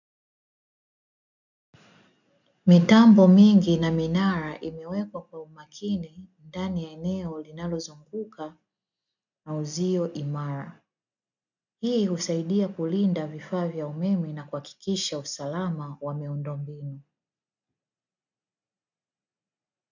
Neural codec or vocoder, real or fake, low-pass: none; real; 7.2 kHz